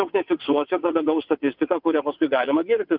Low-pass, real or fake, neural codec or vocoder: 5.4 kHz; fake; vocoder, 22.05 kHz, 80 mel bands, WaveNeXt